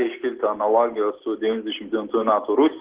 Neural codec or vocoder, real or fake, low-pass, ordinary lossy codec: none; real; 3.6 kHz; Opus, 16 kbps